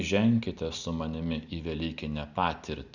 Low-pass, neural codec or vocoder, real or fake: 7.2 kHz; none; real